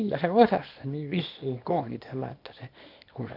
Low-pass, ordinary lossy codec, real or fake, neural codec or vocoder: 5.4 kHz; none; fake; codec, 24 kHz, 0.9 kbps, WavTokenizer, small release